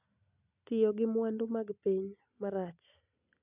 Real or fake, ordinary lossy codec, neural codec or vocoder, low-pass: real; none; none; 3.6 kHz